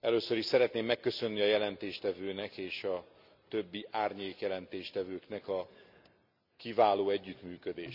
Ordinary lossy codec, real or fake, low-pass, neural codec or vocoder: none; real; 5.4 kHz; none